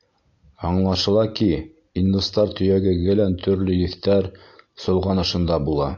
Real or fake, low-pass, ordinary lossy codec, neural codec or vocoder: real; 7.2 kHz; AAC, 48 kbps; none